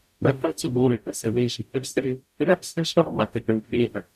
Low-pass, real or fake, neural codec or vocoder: 14.4 kHz; fake; codec, 44.1 kHz, 0.9 kbps, DAC